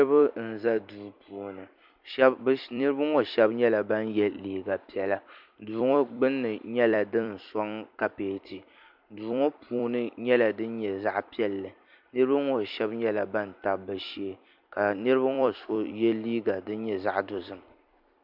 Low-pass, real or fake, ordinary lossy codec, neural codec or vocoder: 5.4 kHz; fake; MP3, 48 kbps; vocoder, 44.1 kHz, 128 mel bands every 256 samples, BigVGAN v2